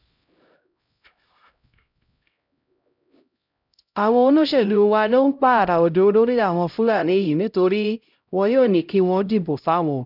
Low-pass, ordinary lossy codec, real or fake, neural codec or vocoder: 5.4 kHz; none; fake; codec, 16 kHz, 0.5 kbps, X-Codec, HuBERT features, trained on LibriSpeech